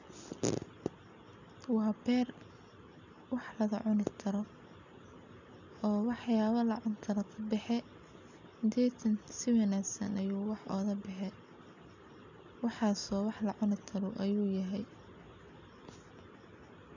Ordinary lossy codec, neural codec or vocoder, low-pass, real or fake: none; none; 7.2 kHz; real